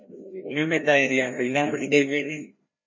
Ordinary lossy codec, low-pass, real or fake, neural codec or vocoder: MP3, 32 kbps; 7.2 kHz; fake; codec, 16 kHz, 1 kbps, FreqCodec, larger model